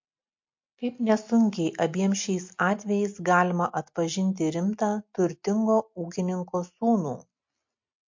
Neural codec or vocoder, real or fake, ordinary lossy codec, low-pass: none; real; MP3, 48 kbps; 7.2 kHz